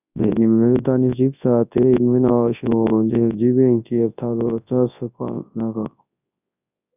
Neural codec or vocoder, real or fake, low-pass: codec, 24 kHz, 0.9 kbps, WavTokenizer, large speech release; fake; 3.6 kHz